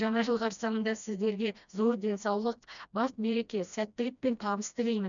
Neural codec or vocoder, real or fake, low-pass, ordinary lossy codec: codec, 16 kHz, 1 kbps, FreqCodec, smaller model; fake; 7.2 kHz; none